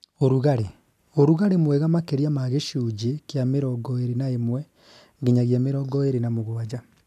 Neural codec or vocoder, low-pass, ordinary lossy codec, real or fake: none; 14.4 kHz; none; real